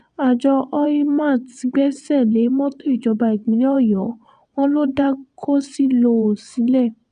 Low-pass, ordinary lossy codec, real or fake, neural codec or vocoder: 9.9 kHz; none; fake; vocoder, 22.05 kHz, 80 mel bands, WaveNeXt